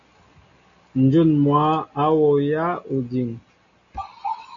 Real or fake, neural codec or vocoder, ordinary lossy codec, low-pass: real; none; AAC, 48 kbps; 7.2 kHz